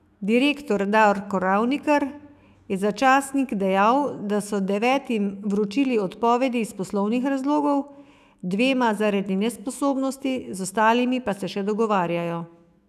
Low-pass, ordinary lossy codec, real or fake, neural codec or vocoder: 14.4 kHz; none; fake; autoencoder, 48 kHz, 128 numbers a frame, DAC-VAE, trained on Japanese speech